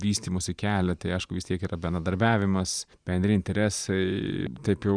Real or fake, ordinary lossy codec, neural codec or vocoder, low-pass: fake; Opus, 64 kbps; vocoder, 44.1 kHz, 128 mel bands every 512 samples, BigVGAN v2; 9.9 kHz